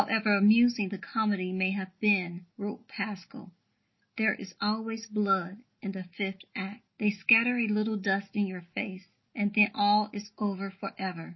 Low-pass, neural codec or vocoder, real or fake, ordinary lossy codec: 7.2 kHz; none; real; MP3, 24 kbps